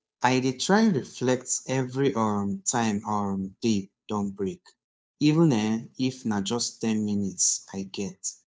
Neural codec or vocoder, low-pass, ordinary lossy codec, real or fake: codec, 16 kHz, 2 kbps, FunCodec, trained on Chinese and English, 25 frames a second; none; none; fake